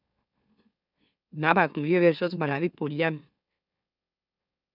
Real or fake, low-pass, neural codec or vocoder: fake; 5.4 kHz; autoencoder, 44.1 kHz, a latent of 192 numbers a frame, MeloTTS